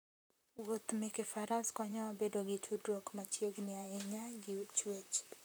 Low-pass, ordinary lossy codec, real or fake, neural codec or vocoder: none; none; fake; vocoder, 44.1 kHz, 128 mel bands, Pupu-Vocoder